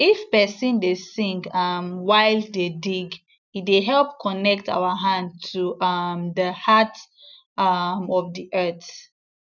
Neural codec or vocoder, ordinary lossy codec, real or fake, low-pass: none; none; real; 7.2 kHz